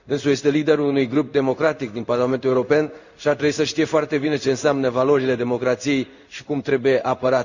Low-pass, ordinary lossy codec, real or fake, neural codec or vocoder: 7.2 kHz; none; fake; codec, 16 kHz in and 24 kHz out, 1 kbps, XY-Tokenizer